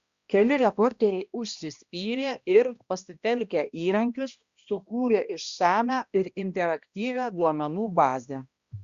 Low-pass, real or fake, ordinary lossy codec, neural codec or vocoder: 7.2 kHz; fake; Opus, 64 kbps; codec, 16 kHz, 1 kbps, X-Codec, HuBERT features, trained on balanced general audio